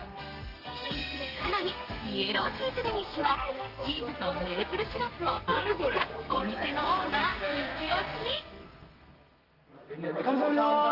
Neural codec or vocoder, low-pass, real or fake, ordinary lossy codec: codec, 32 kHz, 1.9 kbps, SNAC; 5.4 kHz; fake; Opus, 32 kbps